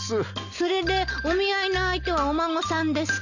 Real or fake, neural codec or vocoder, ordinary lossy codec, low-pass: real; none; none; 7.2 kHz